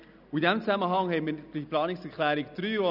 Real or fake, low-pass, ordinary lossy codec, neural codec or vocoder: real; 5.4 kHz; none; none